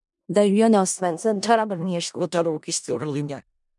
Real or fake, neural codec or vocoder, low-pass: fake; codec, 16 kHz in and 24 kHz out, 0.4 kbps, LongCat-Audio-Codec, four codebook decoder; 10.8 kHz